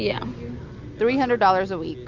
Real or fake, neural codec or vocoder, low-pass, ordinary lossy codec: real; none; 7.2 kHz; MP3, 64 kbps